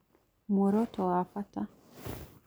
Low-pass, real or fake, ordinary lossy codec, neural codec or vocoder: none; real; none; none